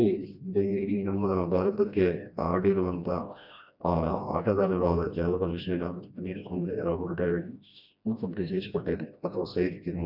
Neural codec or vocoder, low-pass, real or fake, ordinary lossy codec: codec, 16 kHz, 1 kbps, FreqCodec, smaller model; 5.4 kHz; fake; none